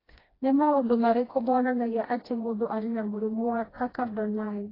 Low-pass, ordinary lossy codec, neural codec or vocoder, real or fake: 5.4 kHz; AAC, 24 kbps; codec, 16 kHz, 1 kbps, FreqCodec, smaller model; fake